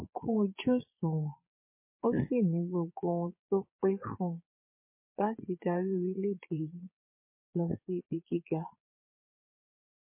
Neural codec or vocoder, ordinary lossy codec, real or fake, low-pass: vocoder, 22.05 kHz, 80 mel bands, Vocos; MP3, 24 kbps; fake; 3.6 kHz